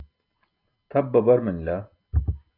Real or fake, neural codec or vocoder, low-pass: real; none; 5.4 kHz